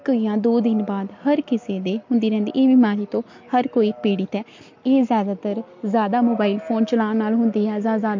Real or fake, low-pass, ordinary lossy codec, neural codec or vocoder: real; 7.2 kHz; MP3, 48 kbps; none